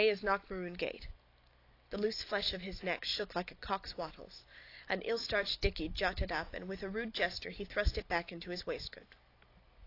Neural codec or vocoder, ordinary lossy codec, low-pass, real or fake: none; AAC, 32 kbps; 5.4 kHz; real